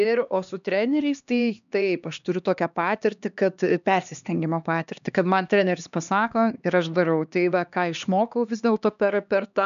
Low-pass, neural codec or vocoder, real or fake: 7.2 kHz; codec, 16 kHz, 2 kbps, X-Codec, HuBERT features, trained on LibriSpeech; fake